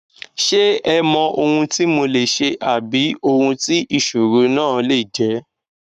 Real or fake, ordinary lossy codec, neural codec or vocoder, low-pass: fake; none; codec, 44.1 kHz, 7.8 kbps, Pupu-Codec; 14.4 kHz